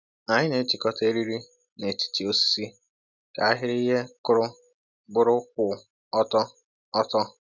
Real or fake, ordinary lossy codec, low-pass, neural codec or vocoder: real; none; 7.2 kHz; none